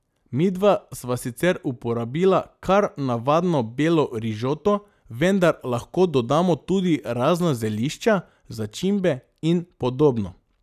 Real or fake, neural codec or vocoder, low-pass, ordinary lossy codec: real; none; 14.4 kHz; none